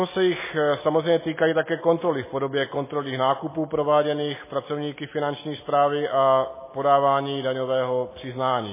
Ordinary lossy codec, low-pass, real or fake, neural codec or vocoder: MP3, 16 kbps; 3.6 kHz; real; none